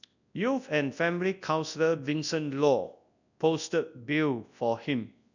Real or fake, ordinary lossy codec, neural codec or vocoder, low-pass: fake; none; codec, 24 kHz, 0.9 kbps, WavTokenizer, large speech release; 7.2 kHz